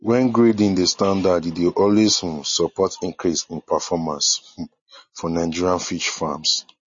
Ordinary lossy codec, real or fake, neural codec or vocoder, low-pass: MP3, 32 kbps; real; none; 7.2 kHz